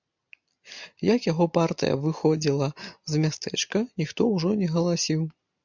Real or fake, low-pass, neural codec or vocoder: real; 7.2 kHz; none